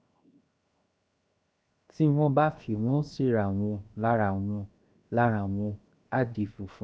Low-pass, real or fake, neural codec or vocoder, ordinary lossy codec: none; fake; codec, 16 kHz, 0.7 kbps, FocalCodec; none